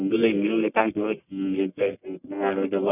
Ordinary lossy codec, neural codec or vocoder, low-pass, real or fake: none; codec, 44.1 kHz, 1.7 kbps, Pupu-Codec; 3.6 kHz; fake